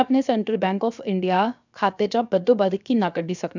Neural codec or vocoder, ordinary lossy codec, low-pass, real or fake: codec, 16 kHz, 0.7 kbps, FocalCodec; none; 7.2 kHz; fake